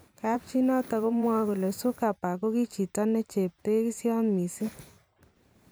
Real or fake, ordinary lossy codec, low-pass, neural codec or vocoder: fake; none; none; vocoder, 44.1 kHz, 128 mel bands every 512 samples, BigVGAN v2